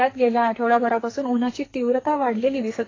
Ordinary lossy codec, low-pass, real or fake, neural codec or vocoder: AAC, 32 kbps; 7.2 kHz; fake; codec, 44.1 kHz, 2.6 kbps, SNAC